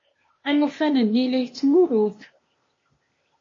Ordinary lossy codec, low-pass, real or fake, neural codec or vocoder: MP3, 32 kbps; 7.2 kHz; fake; codec, 16 kHz, 0.8 kbps, ZipCodec